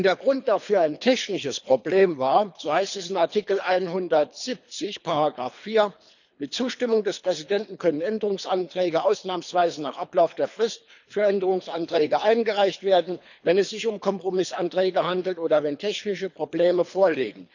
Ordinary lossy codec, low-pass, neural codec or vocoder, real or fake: none; 7.2 kHz; codec, 24 kHz, 3 kbps, HILCodec; fake